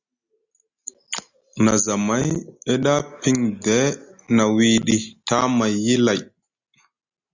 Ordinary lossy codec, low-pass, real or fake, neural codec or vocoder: Opus, 64 kbps; 7.2 kHz; real; none